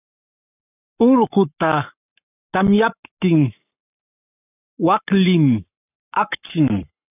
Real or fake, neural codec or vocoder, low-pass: fake; codec, 44.1 kHz, 7.8 kbps, Pupu-Codec; 3.6 kHz